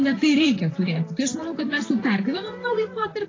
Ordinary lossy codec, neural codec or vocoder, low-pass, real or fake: AAC, 32 kbps; vocoder, 44.1 kHz, 128 mel bands, Pupu-Vocoder; 7.2 kHz; fake